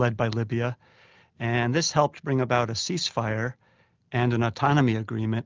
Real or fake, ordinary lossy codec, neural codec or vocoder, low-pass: real; Opus, 24 kbps; none; 7.2 kHz